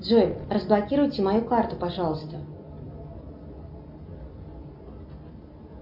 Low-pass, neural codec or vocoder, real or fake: 5.4 kHz; none; real